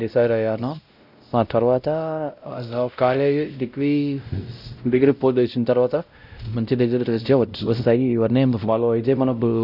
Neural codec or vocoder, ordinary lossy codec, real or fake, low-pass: codec, 16 kHz, 0.5 kbps, X-Codec, WavLM features, trained on Multilingual LibriSpeech; none; fake; 5.4 kHz